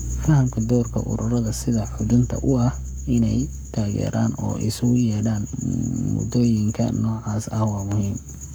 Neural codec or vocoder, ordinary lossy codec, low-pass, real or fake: codec, 44.1 kHz, 7.8 kbps, Pupu-Codec; none; none; fake